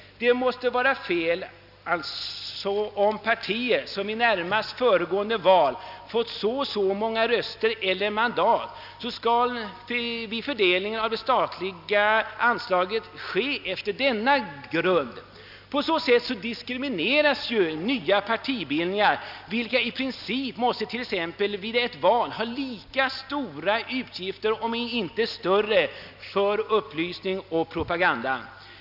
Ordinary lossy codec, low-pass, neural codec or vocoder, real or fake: none; 5.4 kHz; none; real